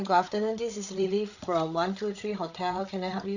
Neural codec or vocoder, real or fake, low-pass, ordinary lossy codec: codec, 16 kHz, 8 kbps, FreqCodec, larger model; fake; 7.2 kHz; none